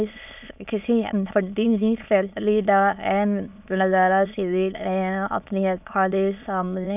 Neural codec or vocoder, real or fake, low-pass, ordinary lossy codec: autoencoder, 22.05 kHz, a latent of 192 numbers a frame, VITS, trained on many speakers; fake; 3.6 kHz; none